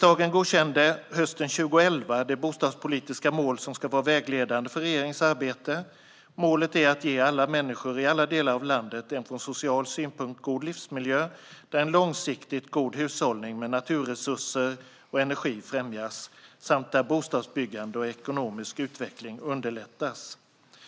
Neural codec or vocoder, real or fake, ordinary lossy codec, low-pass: none; real; none; none